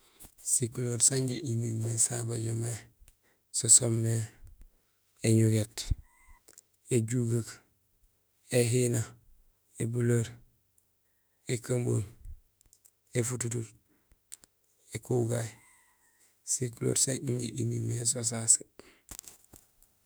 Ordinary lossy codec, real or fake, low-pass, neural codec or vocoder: none; fake; none; autoencoder, 48 kHz, 32 numbers a frame, DAC-VAE, trained on Japanese speech